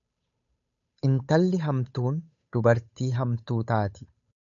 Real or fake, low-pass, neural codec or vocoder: fake; 7.2 kHz; codec, 16 kHz, 8 kbps, FunCodec, trained on Chinese and English, 25 frames a second